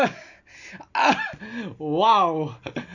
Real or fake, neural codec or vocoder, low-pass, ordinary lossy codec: real; none; 7.2 kHz; none